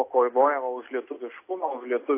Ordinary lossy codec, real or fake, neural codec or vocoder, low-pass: AAC, 24 kbps; real; none; 3.6 kHz